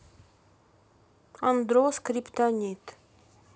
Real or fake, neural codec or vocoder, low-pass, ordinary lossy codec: real; none; none; none